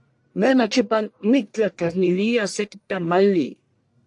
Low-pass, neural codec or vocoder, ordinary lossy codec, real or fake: 10.8 kHz; codec, 44.1 kHz, 1.7 kbps, Pupu-Codec; AAC, 64 kbps; fake